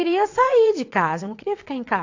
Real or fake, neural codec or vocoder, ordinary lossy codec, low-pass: fake; vocoder, 22.05 kHz, 80 mel bands, WaveNeXt; AAC, 48 kbps; 7.2 kHz